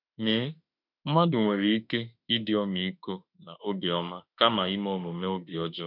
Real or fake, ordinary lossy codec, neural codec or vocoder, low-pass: fake; none; autoencoder, 48 kHz, 32 numbers a frame, DAC-VAE, trained on Japanese speech; 5.4 kHz